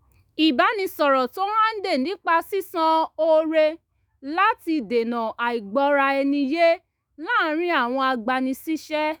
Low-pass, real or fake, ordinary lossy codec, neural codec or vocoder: none; fake; none; autoencoder, 48 kHz, 128 numbers a frame, DAC-VAE, trained on Japanese speech